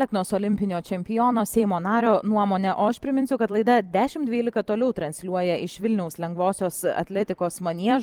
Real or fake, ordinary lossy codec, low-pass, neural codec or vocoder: fake; Opus, 24 kbps; 19.8 kHz; vocoder, 44.1 kHz, 128 mel bands every 256 samples, BigVGAN v2